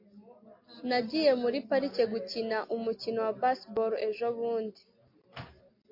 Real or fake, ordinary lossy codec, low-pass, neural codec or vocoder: real; MP3, 32 kbps; 5.4 kHz; none